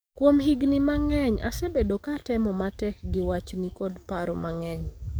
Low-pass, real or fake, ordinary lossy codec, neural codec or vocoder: none; fake; none; codec, 44.1 kHz, 7.8 kbps, DAC